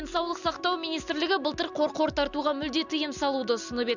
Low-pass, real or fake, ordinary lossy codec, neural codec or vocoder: 7.2 kHz; real; none; none